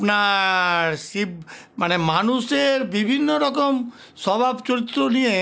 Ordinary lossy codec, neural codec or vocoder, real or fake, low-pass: none; none; real; none